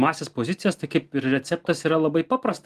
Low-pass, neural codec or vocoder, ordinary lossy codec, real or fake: 14.4 kHz; none; Opus, 24 kbps; real